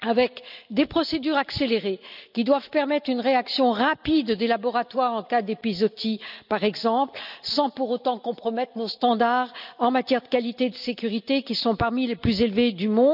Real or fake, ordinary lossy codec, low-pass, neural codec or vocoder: real; none; 5.4 kHz; none